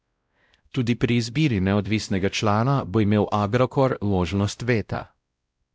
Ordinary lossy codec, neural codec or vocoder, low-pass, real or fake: none; codec, 16 kHz, 0.5 kbps, X-Codec, WavLM features, trained on Multilingual LibriSpeech; none; fake